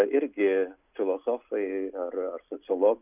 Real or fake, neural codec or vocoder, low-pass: real; none; 3.6 kHz